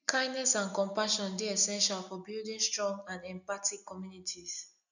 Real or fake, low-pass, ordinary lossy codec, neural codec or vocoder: real; 7.2 kHz; none; none